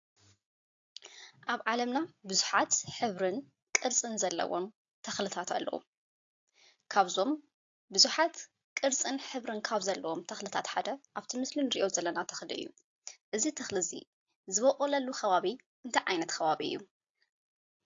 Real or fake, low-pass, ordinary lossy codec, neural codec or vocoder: real; 7.2 kHz; AAC, 48 kbps; none